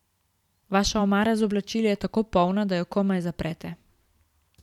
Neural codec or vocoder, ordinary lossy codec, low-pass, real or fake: vocoder, 44.1 kHz, 128 mel bands every 512 samples, BigVGAN v2; none; 19.8 kHz; fake